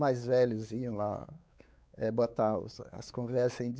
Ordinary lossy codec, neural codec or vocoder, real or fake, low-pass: none; codec, 16 kHz, 4 kbps, X-Codec, WavLM features, trained on Multilingual LibriSpeech; fake; none